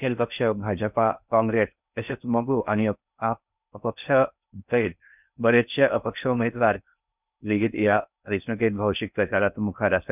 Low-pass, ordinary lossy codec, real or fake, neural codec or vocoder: 3.6 kHz; none; fake; codec, 16 kHz in and 24 kHz out, 0.6 kbps, FocalCodec, streaming, 4096 codes